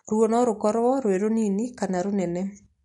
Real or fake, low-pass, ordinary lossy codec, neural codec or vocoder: real; 14.4 kHz; MP3, 48 kbps; none